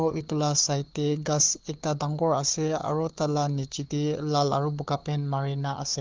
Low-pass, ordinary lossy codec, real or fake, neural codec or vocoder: 7.2 kHz; Opus, 24 kbps; fake; codec, 16 kHz, 4 kbps, FunCodec, trained on Chinese and English, 50 frames a second